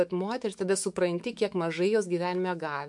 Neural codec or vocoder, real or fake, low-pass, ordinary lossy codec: autoencoder, 48 kHz, 128 numbers a frame, DAC-VAE, trained on Japanese speech; fake; 10.8 kHz; MP3, 64 kbps